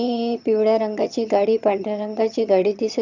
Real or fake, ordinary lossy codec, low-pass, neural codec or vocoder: fake; none; 7.2 kHz; vocoder, 22.05 kHz, 80 mel bands, HiFi-GAN